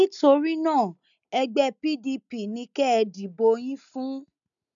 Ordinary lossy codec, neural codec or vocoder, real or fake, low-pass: none; none; real; 7.2 kHz